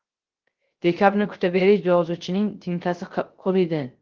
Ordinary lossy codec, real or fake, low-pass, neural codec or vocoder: Opus, 16 kbps; fake; 7.2 kHz; codec, 16 kHz, 0.3 kbps, FocalCodec